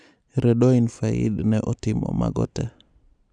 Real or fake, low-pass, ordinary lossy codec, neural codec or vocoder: real; 9.9 kHz; none; none